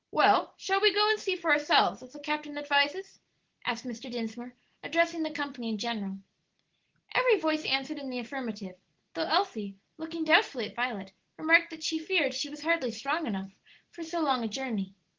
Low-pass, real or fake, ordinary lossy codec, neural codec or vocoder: 7.2 kHz; real; Opus, 16 kbps; none